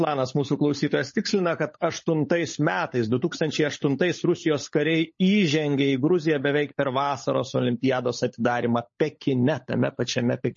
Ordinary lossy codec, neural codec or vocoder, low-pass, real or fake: MP3, 32 kbps; codec, 16 kHz, 16 kbps, FunCodec, trained on LibriTTS, 50 frames a second; 7.2 kHz; fake